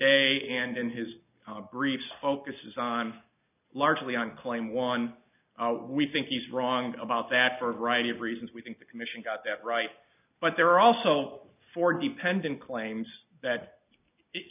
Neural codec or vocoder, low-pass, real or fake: none; 3.6 kHz; real